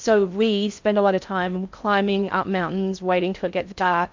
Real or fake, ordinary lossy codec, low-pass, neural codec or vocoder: fake; MP3, 64 kbps; 7.2 kHz; codec, 16 kHz in and 24 kHz out, 0.6 kbps, FocalCodec, streaming, 4096 codes